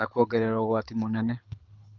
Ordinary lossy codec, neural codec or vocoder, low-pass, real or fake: Opus, 24 kbps; codec, 24 kHz, 6 kbps, HILCodec; 7.2 kHz; fake